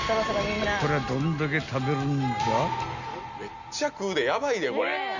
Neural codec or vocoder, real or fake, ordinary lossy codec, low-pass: none; real; none; 7.2 kHz